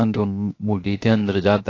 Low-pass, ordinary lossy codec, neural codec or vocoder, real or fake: 7.2 kHz; AAC, 32 kbps; codec, 16 kHz, 0.7 kbps, FocalCodec; fake